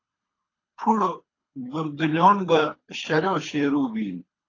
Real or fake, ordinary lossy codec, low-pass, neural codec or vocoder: fake; AAC, 32 kbps; 7.2 kHz; codec, 24 kHz, 3 kbps, HILCodec